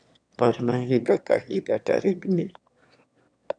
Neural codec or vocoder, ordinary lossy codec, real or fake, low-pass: autoencoder, 22.05 kHz, a latent of 192 numbers a frame, VITS, trained on one speaker; none; fake; 9.9 kHz